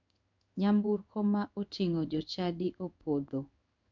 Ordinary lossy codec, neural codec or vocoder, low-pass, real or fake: none; codec, 16 kHz in and 24 kHz out, 1 kbps, XY-Tokenizer; 7.2 kHz; fake